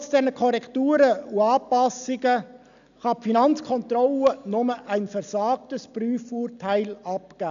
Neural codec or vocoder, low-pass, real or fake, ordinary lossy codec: none; 7.2 kHz; real; none